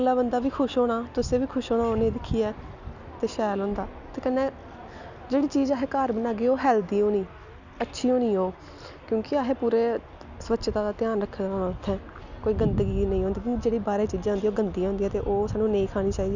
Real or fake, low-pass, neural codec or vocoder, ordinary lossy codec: real; 7.2 kHz; none; none